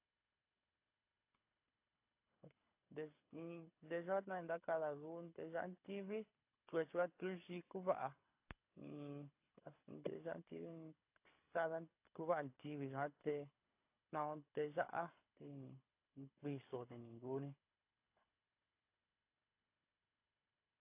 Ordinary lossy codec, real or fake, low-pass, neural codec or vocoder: AAC, 32 kbps; fake; 3.6 kHz; codec, 24 kHz, 6 kbps, HILCodec